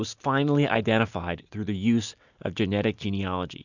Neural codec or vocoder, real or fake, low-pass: none; real; 7.2 kHz